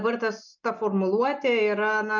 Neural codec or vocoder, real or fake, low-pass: none; real; 7.2 kHz